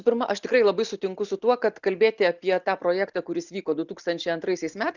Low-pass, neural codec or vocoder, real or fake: 7.2 kHz; none; real